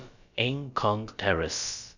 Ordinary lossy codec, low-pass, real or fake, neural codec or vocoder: none; 7.2 kHz; fake; codec, 16 kHz, about 1 kbps, DyCAST, with the encoder's durations